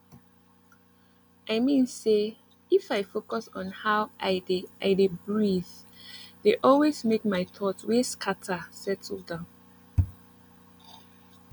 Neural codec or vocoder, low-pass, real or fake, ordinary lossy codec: none; none; real; none